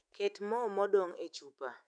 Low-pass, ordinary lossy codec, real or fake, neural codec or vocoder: 9.9 kHz; none; fake; autoencoder, 48 kHz, 128 numbers a frame, DAC-VAE, trained on Japanese speech